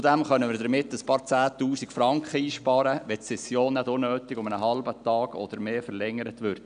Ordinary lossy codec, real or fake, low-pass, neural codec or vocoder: none; real; 9.9 kHz; none